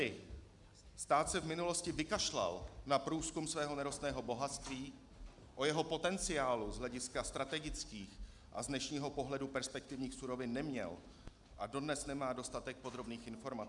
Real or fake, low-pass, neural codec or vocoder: real; 10.8 kHz; none